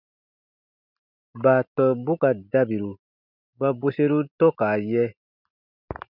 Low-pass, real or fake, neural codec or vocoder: 5.4 kHz; real; none